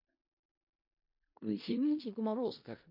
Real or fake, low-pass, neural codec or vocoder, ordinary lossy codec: fake; 5.4 kHz; codec, 16 kHz in and 24 kHz out, 0.4 kbps, LongCat-Audio-Codec, four codebook decoder; MP3, 32 kbps